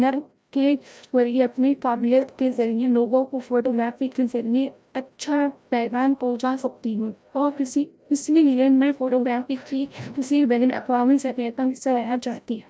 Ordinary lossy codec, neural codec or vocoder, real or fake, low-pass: none; codec, 16 kHz, 0.5 kbps, FreqCodec, larger model; fake; none